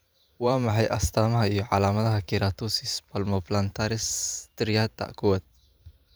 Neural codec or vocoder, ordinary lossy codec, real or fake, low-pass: vocoder, 44.1 kHz, 128 mel bands every 512 samples, BigVGAN v2; none; fake; none